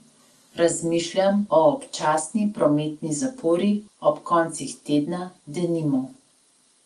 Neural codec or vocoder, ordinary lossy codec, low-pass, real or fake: none; Opus, 24 kbps; 10.8 kHz; real